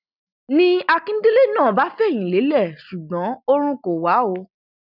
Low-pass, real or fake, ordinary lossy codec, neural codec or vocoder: 5.4 kHz; real; none; none